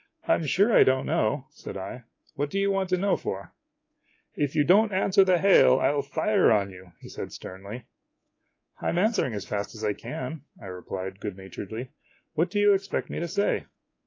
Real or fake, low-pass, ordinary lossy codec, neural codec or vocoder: fake; 7.2 kHz; AAC, 32 kbps; codec, 24 kHz, 3.1 kbps, DualCodec